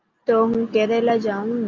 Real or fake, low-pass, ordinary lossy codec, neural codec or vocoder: real; 7.2 kHz; Opus, 24 kbps; none